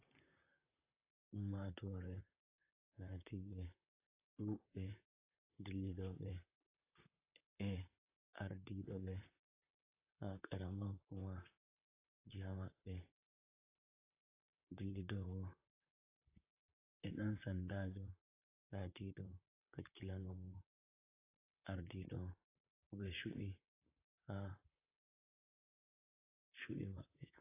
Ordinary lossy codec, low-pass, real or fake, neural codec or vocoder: AAC, 24 kbps; 3.6 kHz; fake; vocoder, 44.1 kHz, 128 mel bands, Pupu-Vocoder